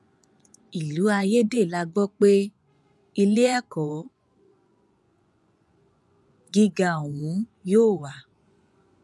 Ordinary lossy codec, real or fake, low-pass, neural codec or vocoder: none; fake; none; vocoder, 24 kHz, 100 mel bands, Vocos